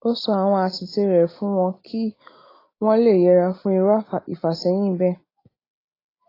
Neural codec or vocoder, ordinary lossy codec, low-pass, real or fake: none; AAC, 24 kbps; 5.4 kHz; real